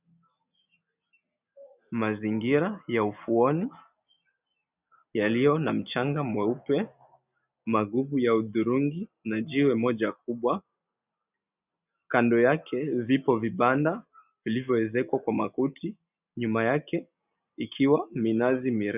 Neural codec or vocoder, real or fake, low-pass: none; real; 3.6 kHz